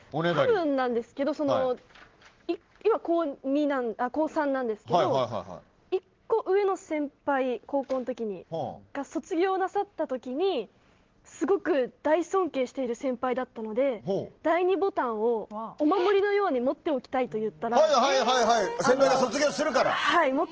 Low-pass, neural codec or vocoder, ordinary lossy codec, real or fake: 7.2 kHz; none; Opus, 16 kbps; real